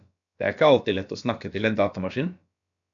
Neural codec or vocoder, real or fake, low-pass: codec, 16 kHz, about 1 kbps, DyCAST, with the encoder's durations; fake; 7.2 kHz